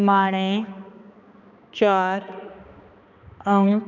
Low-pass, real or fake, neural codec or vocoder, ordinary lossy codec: 7.2 kHz; fake; codec, 16 kHz, 2 kbps, X-Codec, HuBERT features, trained on balanced general audio; none